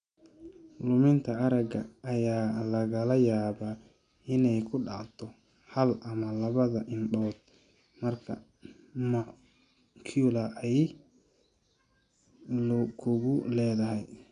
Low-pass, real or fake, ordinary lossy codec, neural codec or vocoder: 9.9 kHz; real; none; none